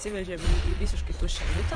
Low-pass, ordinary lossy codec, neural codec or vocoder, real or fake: 9.9 kHz; MP3, 48 kbps; vocoder, 22.05 kHz, 80 mel bands, Vocos; fake